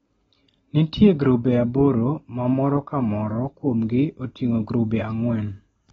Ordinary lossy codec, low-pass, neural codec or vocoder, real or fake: AAC, 24 kbps; 19.8 kHz; none; real